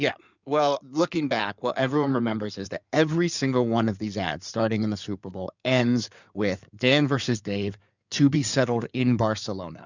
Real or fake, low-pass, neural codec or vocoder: fake; 7.2 kHz; codec, 16 kHz in and 24 kHz out, 2.2 kbps, FireRedTTS-2 codec